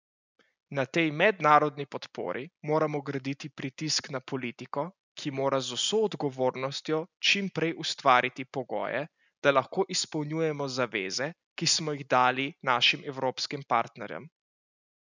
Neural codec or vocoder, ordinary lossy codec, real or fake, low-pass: none; none; real; 7.2 kHz